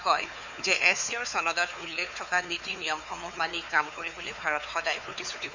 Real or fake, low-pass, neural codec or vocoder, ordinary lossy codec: fake; 7.2 kHz; codec, 16 kHz, 4 kbps, X-Codec, WavLM features, trained on Multilingual LibriSpeech; Opus, 64 kbps